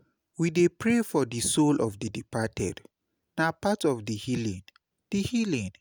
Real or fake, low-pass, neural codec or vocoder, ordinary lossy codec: real; none; none; none